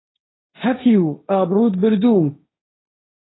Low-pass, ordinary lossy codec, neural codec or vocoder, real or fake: 7.2 kHz; AAC, 16 kbps; codec, 16 kHz, 1.1 kbps, Voila-Tokenizer; fake